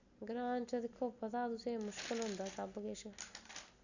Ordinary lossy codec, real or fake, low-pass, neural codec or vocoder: none; real; 7.2 kHz; none